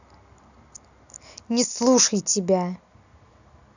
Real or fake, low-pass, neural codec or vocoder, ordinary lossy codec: real; 7.2 kHz; none; none